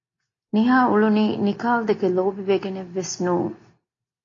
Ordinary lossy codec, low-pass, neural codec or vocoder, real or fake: AAC, 32 kbps; 7.2 kHz; none; real